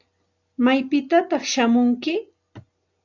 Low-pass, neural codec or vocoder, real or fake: 7.2 kHz; none; real